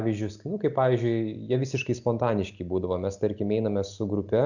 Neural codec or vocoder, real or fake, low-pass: none; real; 7.2 kHz